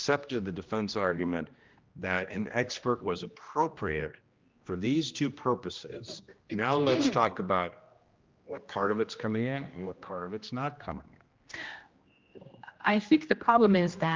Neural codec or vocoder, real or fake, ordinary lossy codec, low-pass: codec, 16 kHz, 1 kbps, X-Codec, HuBERT features, trained on general audio; fake; Opus, 24 kbps; 7.2 kHz